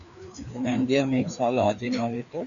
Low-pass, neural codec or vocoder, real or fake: 7.2 kHz; codec, 16 kHz, 2 kbps, FreqCodec, larger model; fake